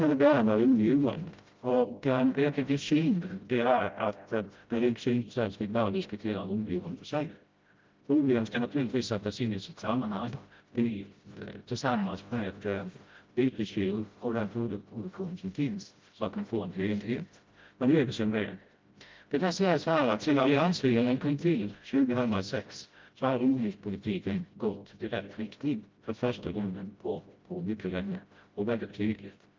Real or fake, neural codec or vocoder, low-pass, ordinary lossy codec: fake; codec, 16 kHz, 0.5 kbps, FreqCodec, smaller model; 7.2 kHz; Opus, 24 kbps